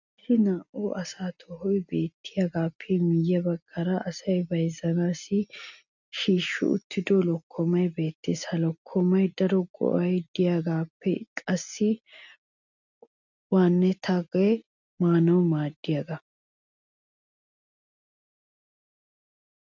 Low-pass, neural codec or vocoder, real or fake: 7.2 kHz; none; real